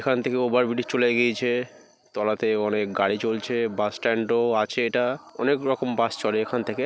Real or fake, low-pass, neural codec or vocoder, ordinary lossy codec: real; none; none; none